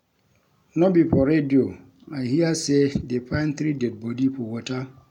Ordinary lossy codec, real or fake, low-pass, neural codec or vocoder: none; real; 19.8 kHz; none